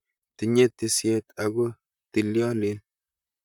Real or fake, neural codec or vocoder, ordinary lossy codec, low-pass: fake; vocoder, 44.1 kHz, 128 mel bands, Pupu-Vocoder; none; 19.8 kHz